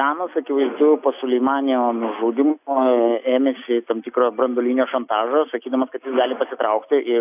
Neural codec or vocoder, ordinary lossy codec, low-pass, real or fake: none; AAC, 32 kbps; 3.6 kHz; real